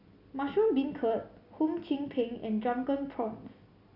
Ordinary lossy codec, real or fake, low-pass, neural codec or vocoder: none; real; 5.4 kHz; none